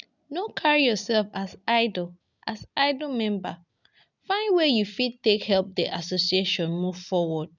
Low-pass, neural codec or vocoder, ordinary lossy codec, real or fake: 7.2 kHz; none; none; real